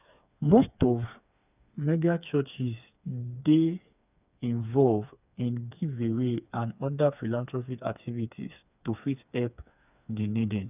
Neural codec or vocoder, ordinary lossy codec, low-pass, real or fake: codec, 16 kHz, 4 kbps, FreqCodec, smaller model; none; 3.6 kHz; fake